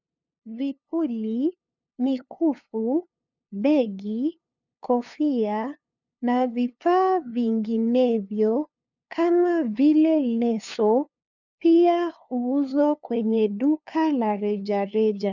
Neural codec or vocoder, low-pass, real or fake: codec, 16 kHz, 2 kbps, FunCodec, trained on LibriTTS, 25 frames a second; 7.2 kHz; fake